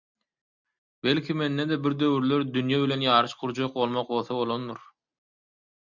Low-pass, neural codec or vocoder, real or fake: 7.2 kHz; none; real